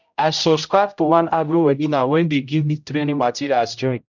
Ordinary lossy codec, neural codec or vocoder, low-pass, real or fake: none; codec, 16 kHz, 0.5 kbps, X-Codec, HuBERT features, trained on general audio; 7.2 kHz; fake